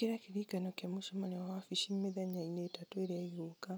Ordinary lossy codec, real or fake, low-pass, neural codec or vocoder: none; real; none; none